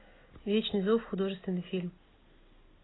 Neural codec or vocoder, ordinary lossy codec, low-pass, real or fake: none; AAC, 16 kbps; 7.2 kHz; real